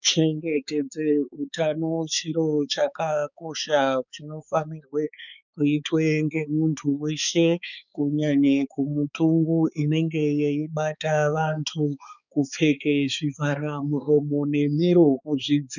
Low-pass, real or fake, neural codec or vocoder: 7.2 kHz; fake; codec, 16 kHz, 4 kbps, X-Codec, HuBERT features, trained on balanced general audio